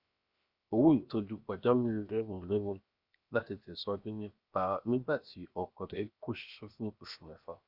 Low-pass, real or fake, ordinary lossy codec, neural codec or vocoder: 5.4 kHz; fake; Opus, 64 kbps; codec, 16 kHz, 0.7 kbps, FocalCodec